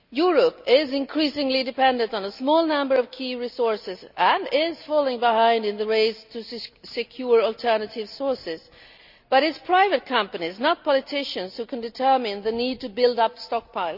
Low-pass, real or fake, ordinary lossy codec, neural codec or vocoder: 5.4 kHz; real; none; none